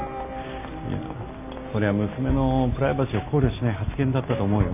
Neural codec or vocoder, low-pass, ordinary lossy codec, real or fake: none; 3.6 kHz; AAC, 32 kbps; real